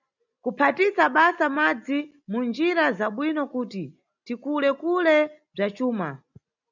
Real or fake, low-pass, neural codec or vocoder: real; 7.2 kHz; none